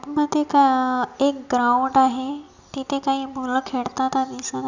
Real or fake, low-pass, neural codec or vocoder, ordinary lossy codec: real; 7.2 kHz; none; none